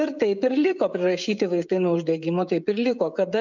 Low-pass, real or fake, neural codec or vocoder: 7.2 kHz; fake; codec, 16 kHz, 16 kbps, FreqCodec, smaller model